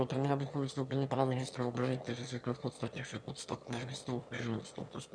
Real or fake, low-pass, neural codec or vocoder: fake; 9.9 kHz; autoencoder, 22.05 kHz, a latent of 192 numbers a frame, VITS, trained on one speaker